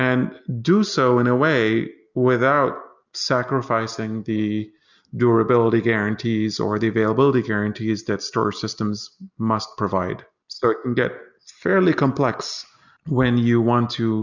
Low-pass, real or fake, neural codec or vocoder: 7.2 kHz; real; none